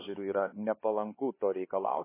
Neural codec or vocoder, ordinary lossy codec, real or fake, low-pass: codec, 16 kHz, 4 kbps, X-Codec, HuBERT features, trained on LibriSpeech; MP3, 16 kbps; fake; 3.6 kHz